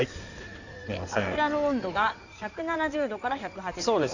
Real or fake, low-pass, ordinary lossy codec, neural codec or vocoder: fake; 7.2 kHz; none; codec, 16 kHz in and 24 kHz out, 2.2 kbps, FireRedTTS-2 codec